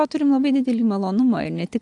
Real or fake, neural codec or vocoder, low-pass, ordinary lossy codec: real; none; 10.8 kHz; AAC, 64 kbps